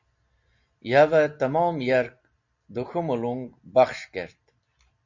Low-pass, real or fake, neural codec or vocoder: 7.2 kHz; real; none